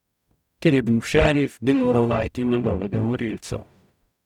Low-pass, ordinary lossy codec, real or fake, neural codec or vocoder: 19.8 kHz; none; fake; codec, 44.1 kHz, 0.9 kbps, DAC